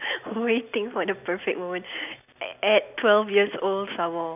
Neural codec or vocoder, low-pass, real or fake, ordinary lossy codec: none; 3.6 kHz; real; none